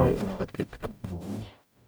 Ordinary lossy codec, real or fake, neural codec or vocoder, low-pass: none; fake; codec, 44.1 kHz, 0.9 kbps, DAC; none